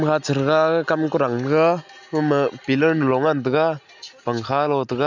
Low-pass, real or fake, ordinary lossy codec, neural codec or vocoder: 7.2 kHz; real; none; none